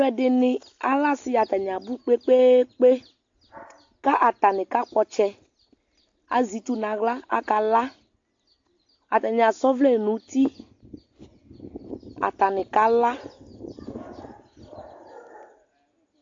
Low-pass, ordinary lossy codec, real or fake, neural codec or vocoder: 7.2 kHz; AAC, 48 kbps; real; none